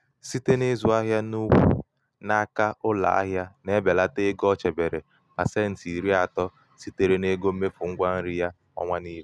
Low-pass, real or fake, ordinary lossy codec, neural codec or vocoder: none; real; none; none